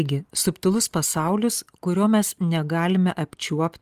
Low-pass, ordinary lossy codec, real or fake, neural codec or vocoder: 14.4 kHz; Opus, 24 kbps; real; none